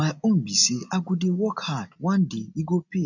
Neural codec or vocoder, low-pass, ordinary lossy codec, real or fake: none; 7.2 kHz; none; real